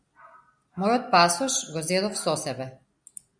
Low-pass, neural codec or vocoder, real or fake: 9.9 kHz; none; real